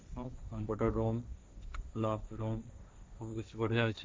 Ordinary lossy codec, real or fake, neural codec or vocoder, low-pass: none; fake; codec, 16 kHz, 1.1 kbps, Voila-Tokenizer; none